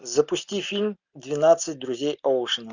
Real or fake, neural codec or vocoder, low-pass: real; none; 7.2 kHz